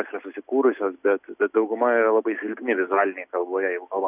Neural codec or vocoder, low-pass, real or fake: none; 3.6 kHz; real